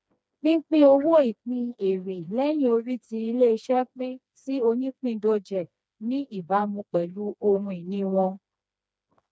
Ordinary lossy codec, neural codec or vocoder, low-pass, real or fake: none; codec, 16 kHz, 2 kbps, FreqCodec, smaller model; none; fake